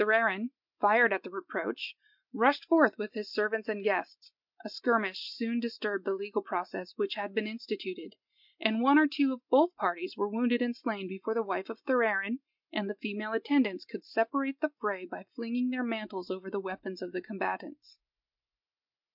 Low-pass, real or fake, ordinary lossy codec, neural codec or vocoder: 5.4 kHz; real; MP3, 48 kbps; none